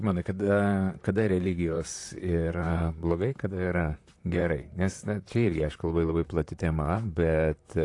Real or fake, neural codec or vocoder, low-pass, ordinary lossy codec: fake; vocoder, 44.1 kHz, 128 mel bands, Pupu-Vocoder; 10.8 kHz; AAC, 48 kbps